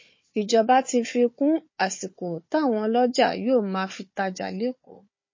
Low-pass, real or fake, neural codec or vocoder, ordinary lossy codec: 7.2 kHz; fake; codec, 16 kHz, 4 kbps, FunCodec, trained on Chinese and English, 50 frames a second; MP3, 32 kbps